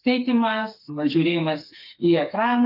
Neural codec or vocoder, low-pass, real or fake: codec, 16 kHz, 4 kbps, FreqCodec, smaller model; 5.4 kHz; fake